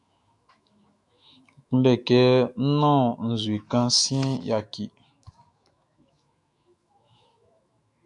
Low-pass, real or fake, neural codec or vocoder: 10.8 kHz; fake; autoencoder, 48 kHz, 128 numbers a frame, DAC-VAE, trained on Japanese speech